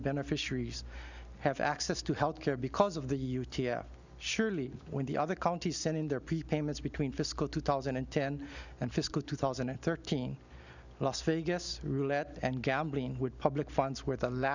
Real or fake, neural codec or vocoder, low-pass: real; none; 7.2 kHz